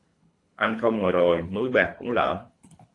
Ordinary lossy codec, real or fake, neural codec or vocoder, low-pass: AAC, 48 kbps; fake; codec, 24 kHz, 3 kbps, HILCodec; 10.8 kHz